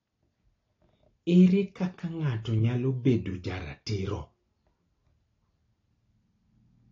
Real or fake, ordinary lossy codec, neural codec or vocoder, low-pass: real; AAC, 32 kbps; none; 7.2 kHz